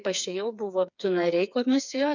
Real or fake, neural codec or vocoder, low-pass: fake; codec, 16 kHz, 4 kbps, FreqCodec, smaller model; 7.2 kHz